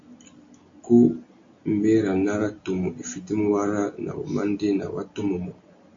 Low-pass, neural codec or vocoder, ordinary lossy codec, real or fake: 7.2 kHz; none; AAC, 48 kbps; real